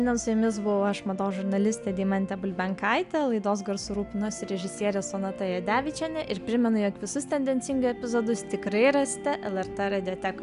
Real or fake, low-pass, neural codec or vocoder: real; 10.8 kHz; none